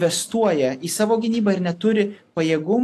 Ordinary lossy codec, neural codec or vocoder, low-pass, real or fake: AAC, 64 kbps; none; 14.4 kHz; real